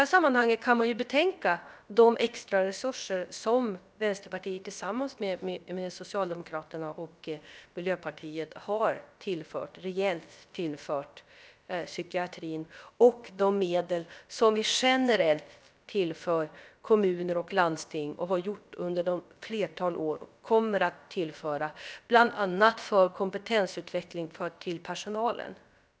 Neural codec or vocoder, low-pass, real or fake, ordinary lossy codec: codec, 16 kHz, about 1 kbps, DyCAST, with the encoder's durations; none; fake; none